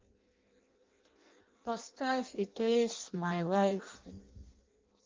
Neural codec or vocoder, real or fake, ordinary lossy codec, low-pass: codec, 16 kHz in and 24 kHz out, 0.6 kbps, FireRedTTS-2 codec; fake; Opus, 32 kbps; 7.2 kHz